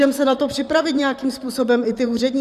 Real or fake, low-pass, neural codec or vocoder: fake; 14.4 kHz; vocoder, 44.1 kHz, 128 mel bands, Pupu-Vocoder